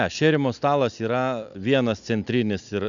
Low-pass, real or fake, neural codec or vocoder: 7.2 kHz; real; none